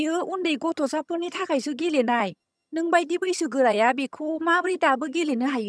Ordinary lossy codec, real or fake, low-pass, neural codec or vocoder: none; fake; none; vocoder, 22.05 kHz, 80 mel bands, HiFi-GAN